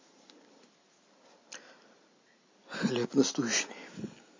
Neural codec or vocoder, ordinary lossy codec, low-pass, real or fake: none; MP3, 32 kbps; 7.2 kHz; real